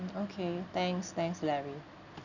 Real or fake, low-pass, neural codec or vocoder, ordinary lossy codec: real; 7.2 kHz; none; none